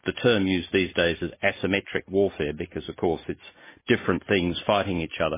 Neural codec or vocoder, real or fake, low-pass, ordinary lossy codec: none; real; 3.6 kHz; MP3, 16 kbps